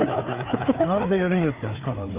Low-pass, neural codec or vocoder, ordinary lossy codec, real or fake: 3.6 kHz; codec, 16 kHz, 4 kbps, FreqCodec, larger model; Opus, 24 kbps; fake